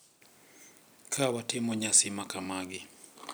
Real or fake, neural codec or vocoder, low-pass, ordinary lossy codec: real; none; none; none